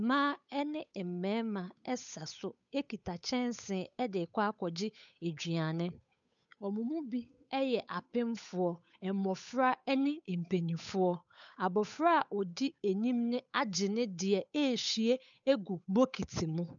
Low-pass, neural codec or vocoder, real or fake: 7.2 kHz; codec, 16 kHz, 8 kbps, FunCodec, trained on Chinese and English, 25 frames a second; fake